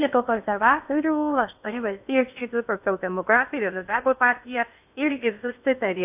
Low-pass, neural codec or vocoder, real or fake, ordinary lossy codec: 3.6 kHz; codec, 16 kHz in and 24 kHz out, 0.6 kbps, FocalCodec, streaming, 4096 codes; fake; AAC, 32 kbps